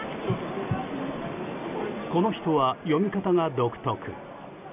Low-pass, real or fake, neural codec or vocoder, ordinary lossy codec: 3.6 kHz; real; none; none